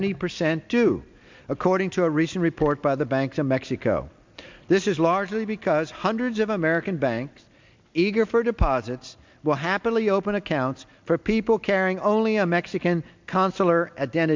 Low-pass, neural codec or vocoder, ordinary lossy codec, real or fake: 7.2 kHz; none; MP3, 64 kbps; real